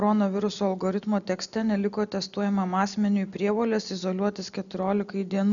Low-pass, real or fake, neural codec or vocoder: 7.2 kHz; real; none